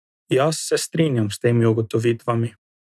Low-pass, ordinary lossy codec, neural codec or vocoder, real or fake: none; none; none; real